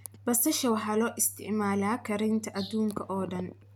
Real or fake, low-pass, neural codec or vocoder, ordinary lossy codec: real; none; none; none